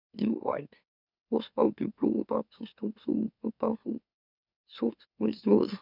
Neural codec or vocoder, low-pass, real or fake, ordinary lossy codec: autoencoder, 44.1 kHz, a latent of 192 numbers a frame, MeloTTS; 5.4 kHz; fake; none